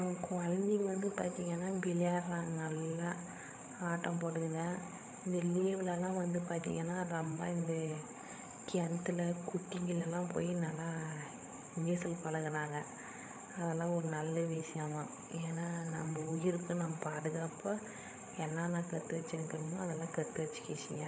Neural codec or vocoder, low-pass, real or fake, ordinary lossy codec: codec, 16 kHz, 8 kbps, FreqCodec, larger model; none; fake; none